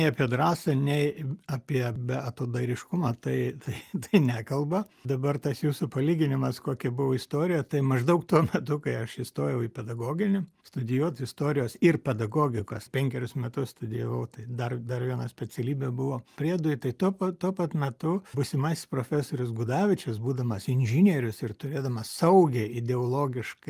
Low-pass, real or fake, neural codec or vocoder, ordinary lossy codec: 14.4 kHz; real; none; Opus, 32 kbps